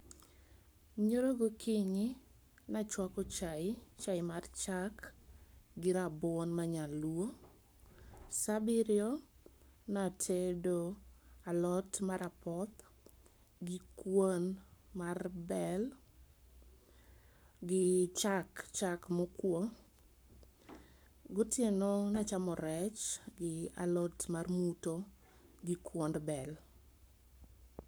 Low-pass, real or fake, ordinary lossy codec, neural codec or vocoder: none; fake; none; codec, 44.1 kHz, 7.8 kbps, Pupu-Codec